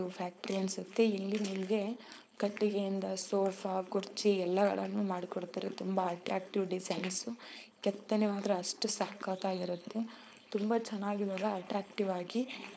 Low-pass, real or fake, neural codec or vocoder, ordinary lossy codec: none; fake; codec, 16 kHz, 4.8 kbps, FACodec; none